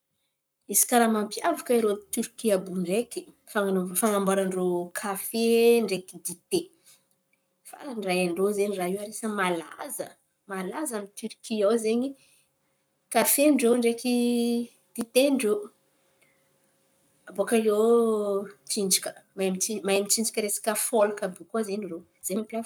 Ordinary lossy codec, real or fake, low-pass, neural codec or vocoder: none; fake; none; codec, 44.1 kHz, 7.8 kbps, Pupu-Codec